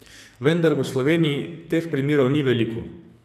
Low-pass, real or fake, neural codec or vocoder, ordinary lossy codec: 14.4 kHz; fake; codec, 44.1 kHz, 2.6 kbps, SNAC; none